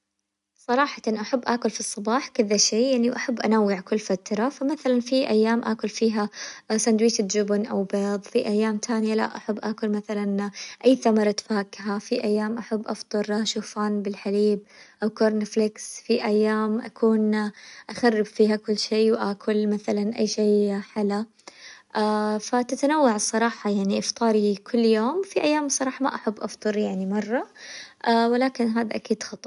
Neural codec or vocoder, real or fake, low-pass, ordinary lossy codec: none; real; 10.8 kHz; none